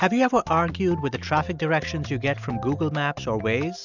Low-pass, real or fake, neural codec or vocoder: 7.2 kHz; real; none